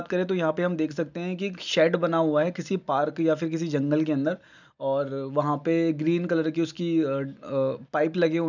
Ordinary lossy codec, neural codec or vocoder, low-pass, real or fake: none; none; 7.2 kHz; real